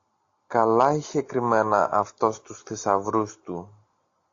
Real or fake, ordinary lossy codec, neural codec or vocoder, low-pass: real; AAC, 48 kbps; none; 7.2 kHz